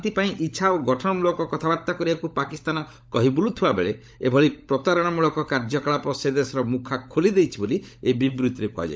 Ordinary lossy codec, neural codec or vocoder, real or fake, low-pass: none; codec, 16 kHz, 16 kbps, FunCodec, trained on LibriTTS, 50 frames a second; fake; none